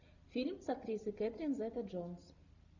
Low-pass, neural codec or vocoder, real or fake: 7.2 kHz; none; real